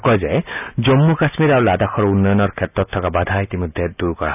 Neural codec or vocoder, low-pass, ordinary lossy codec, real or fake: none; 3.6 kHz; none; real